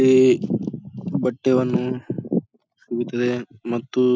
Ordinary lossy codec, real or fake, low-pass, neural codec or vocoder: none; real; none; none